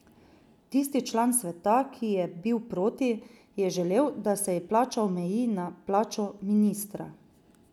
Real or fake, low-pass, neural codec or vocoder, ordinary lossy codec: real; 19.8 kHz; none; none